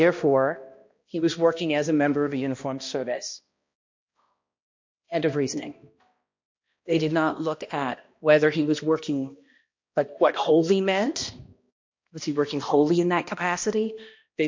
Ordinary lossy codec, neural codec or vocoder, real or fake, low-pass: MP3, 48 kbps; codec, 16 kHz, 1 kbps, X-Codec, HuBERT features, trained on balanced general audio; fake; 7.2 kHz